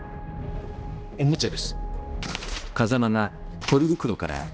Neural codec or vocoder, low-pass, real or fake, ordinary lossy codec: codec, 16 kHz, 1 kbps, X-Codec, HuBERT features, trained on balanced general audio; none; fake; none